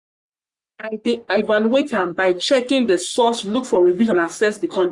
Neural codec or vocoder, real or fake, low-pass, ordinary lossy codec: codec, 44.1 kHz, 3.4 kbps, Pupu-Codec; fake; 10.8 kHz; Opus, 64 kbps